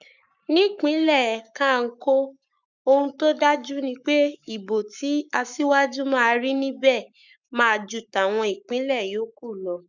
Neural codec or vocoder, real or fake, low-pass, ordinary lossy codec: codec, 44.1 kHz, 7.8 kbps, Pupu-Codec; fake; 7.2 kHz; none